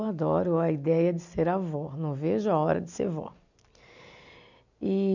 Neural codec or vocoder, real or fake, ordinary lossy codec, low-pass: none; real; none; 7.2 kHz